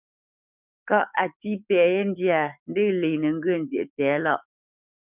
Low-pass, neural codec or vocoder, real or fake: 3.6 kHz; codec, 44.1 kHz, 7.8 kbps, DAC; fake